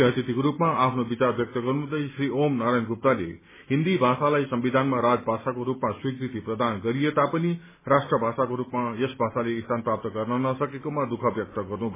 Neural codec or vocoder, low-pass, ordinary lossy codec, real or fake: none; 3.6 kHz; MP3, 16 kbps; real